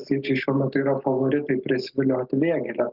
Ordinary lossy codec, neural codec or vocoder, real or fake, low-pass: Opus, 32 kbps; none; real; 5.4 kHz